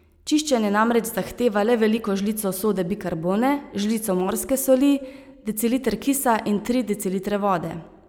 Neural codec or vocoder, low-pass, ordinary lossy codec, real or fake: none; none; none; real